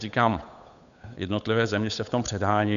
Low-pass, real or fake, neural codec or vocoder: 7.2 kHz; fake; codec, 16 kHz, 8 kbps, FunCodec, trained on Chinese and English, 25 frames a second